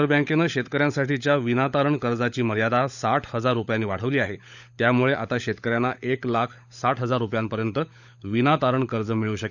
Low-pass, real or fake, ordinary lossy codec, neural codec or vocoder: 7.2 kHz; fake; none; codec, 16 kHz, 16 kbps, FunCodec, trained on LibriTTS, 50 frames a second